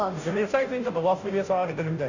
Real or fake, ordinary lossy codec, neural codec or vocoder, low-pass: fake; none; codec, 16 kHz, 0.5 kbps, FunCodec, trained on Chinese and English, 25 frames a second; 7.2 kHz